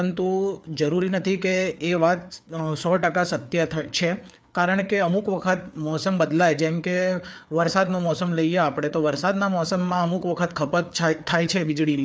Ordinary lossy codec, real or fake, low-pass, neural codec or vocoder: none; fake; none; codec, 16 kHz, 4 kbps, FreqCodec, larger model